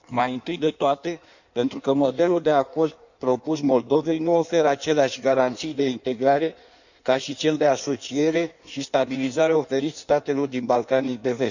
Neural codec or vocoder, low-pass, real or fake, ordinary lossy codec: codec, 16 kHz in and 24 kHz out, 1.1 kbps, FireRedTTS-2 codec; 7.2 kHz; fake; none